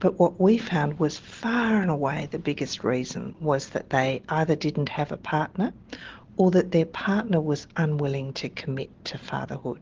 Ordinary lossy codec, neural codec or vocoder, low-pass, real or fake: Opus, 16 kbps; none; 7.2 kHz; real